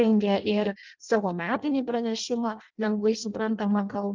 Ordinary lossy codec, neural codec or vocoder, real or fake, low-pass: Opus, 32 kbps; codec, 16 kHz in and 24 kHz out, 0.6 kbps, FireRedTTS-2 codec; fake; 7.2 kHz